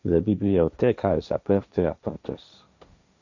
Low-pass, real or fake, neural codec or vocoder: 7.2 kHz; fake; codec, 16 kHz, 1.1 kbps, Voila-Tokenizer